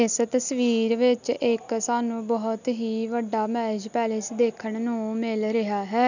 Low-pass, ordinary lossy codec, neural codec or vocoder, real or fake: 7.2 kHz; none; none; real